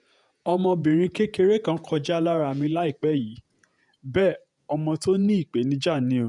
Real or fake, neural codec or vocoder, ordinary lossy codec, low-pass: fake; vocoder, 48 kHz, 128 mel bands, Vocos; none; 10.8 kHz